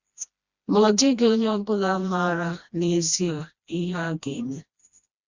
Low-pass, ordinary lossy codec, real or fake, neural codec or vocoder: 7.2 kHz; Opus, 64 kbps; fake; codec, 16 kHz, 1 kbps, FreqCodec, smaller model